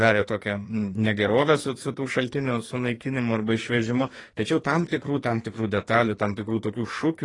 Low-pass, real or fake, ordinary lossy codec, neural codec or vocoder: 10.8 kHz; fake; AAC, 32 kbps; codec, 44.1 kHz, 2.6 kbps, SNAC